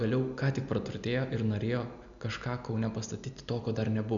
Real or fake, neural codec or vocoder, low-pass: real; none; 7.2 kHz